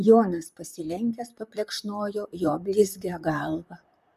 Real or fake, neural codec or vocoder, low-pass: fake; vocoder, 44.1 kHz, 128 mel bands, Pupu-Vocoder; 14.4 kHz